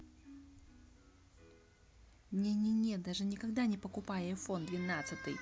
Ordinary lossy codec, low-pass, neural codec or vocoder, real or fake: none; none; none; real